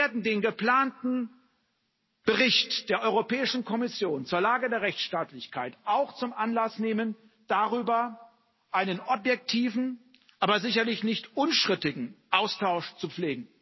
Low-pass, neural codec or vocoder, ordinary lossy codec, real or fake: 7.2 kHz; none; MP3, 24 kbps; real